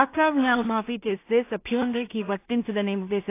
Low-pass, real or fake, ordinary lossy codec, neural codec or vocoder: 3.6 kHz; fake; AAC, 24 kbps; codec, 16 kHz in and 24 kHz out, 0.4 kbps, LongCat-Audio-Codec, two codebook decoder